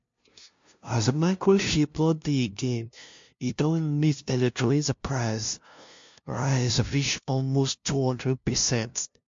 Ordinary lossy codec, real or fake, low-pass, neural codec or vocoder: MP3, 48 kbps; fake; 7.2 kHz; codec, 16 kHz, 0.5 kbps, FunCodec, trained on LibriTTS, 25 frames a second